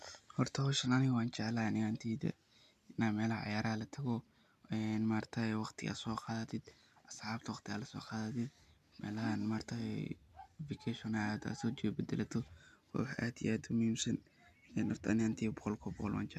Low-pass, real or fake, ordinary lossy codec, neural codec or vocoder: 14.4 kHz; real; none; none